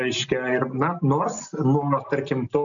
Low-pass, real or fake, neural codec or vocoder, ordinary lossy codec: 7.2 kHz; real; none; MP3, 96 kbps